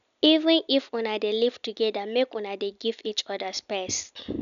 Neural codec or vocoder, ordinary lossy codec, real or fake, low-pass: none; none; real; 7.2 kHz